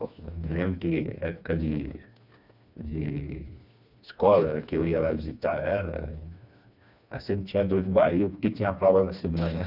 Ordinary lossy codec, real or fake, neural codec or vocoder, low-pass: none; fake; codec, 16 kHz, 2 kbps, FreqCodec, smaller model; 5.4 kHz